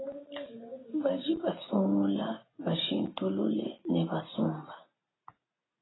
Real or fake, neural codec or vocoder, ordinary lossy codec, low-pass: real; none; AAC, 16 kbps; 7.2 kHz